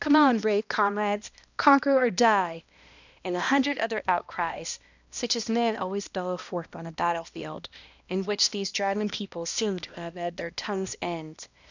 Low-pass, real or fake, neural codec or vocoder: 7.2 kHz; fake; codec, 16 kHz, 1 kbps, X-Codec, HuBERT features, trained on balanced general audio